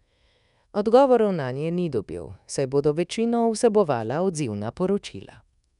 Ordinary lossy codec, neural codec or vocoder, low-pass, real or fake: none; codec, 24 kHz, 1.2 kbps, DualCodec; 10.8 kHz; fake